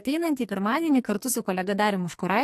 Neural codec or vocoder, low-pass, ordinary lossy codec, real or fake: codec, 44.1 kHz, 2.6 kbps, SNAC; 14.4 kHz; AAC, 64 kbps; fake